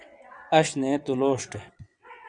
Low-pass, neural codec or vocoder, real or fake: 9.9 kHz; vocoder, 22.05 kHz, 80 mel bands, WaveNeXt; fake